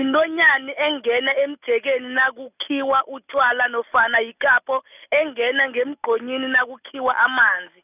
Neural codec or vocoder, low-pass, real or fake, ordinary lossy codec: none; 3.6 kHz; real; none